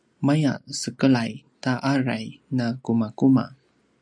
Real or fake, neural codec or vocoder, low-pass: real; none; 9.9 kHz